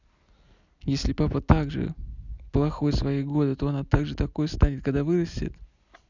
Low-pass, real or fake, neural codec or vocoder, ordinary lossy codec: 7.2 kHz; real; none; none